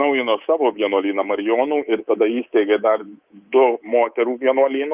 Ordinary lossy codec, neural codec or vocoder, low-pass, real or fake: Opus, 24 kbps; codec, 24 kHz, 3.1 kbps, DualCodec; 3.6 kHz; fake